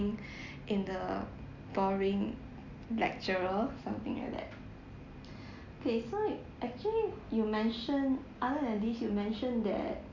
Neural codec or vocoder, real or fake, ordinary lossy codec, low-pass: none; real; Opus, 64 kbps; 7.2 kHz